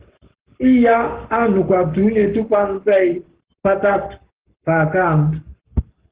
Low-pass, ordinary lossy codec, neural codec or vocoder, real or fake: 3.6 kHz; Opus, 16 kbps; codec, 44.1 kHz, 7.8 kbps, Pupu-Codec; fake